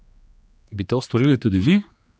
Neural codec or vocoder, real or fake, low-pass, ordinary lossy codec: codec, 16 kHz, 2 kbps, X-Codec, HuBERT features, trained on general audio; fake; none; none